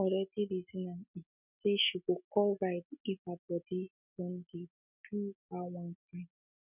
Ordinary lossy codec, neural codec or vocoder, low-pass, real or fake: none; none; 3.6 kHz; real